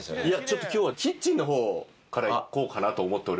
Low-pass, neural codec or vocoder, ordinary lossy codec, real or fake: none; none; none; real